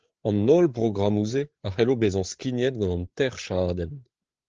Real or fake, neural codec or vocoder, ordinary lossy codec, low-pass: fake; codec, 16 kHz, 8 kbps, FreqCodec, larger model; Opus, 16 kbps; 7.2 kHz